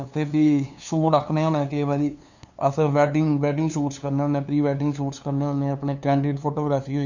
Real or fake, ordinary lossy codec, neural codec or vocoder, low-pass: fake; none; codec, 16 kHz, 2 kbps, FunCodec, trained on LibriTTS, 25 frames a second; 7.2 kHz